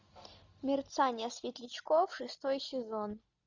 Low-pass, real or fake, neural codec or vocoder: 7.2 kHz; real; none